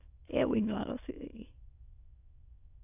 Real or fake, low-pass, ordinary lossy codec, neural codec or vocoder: fake; 3.6 kHz; AAC, 32 kbps; autoencoder, 22.05 kHz, a latent of 192 numbers a frame, VITS, trained on many speakers